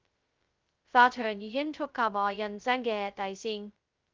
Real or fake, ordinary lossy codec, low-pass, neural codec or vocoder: fake; Opus, 32 kbps; 7.2 kHz; codec, 16 kHz, 0.2 kbps, FocalCodec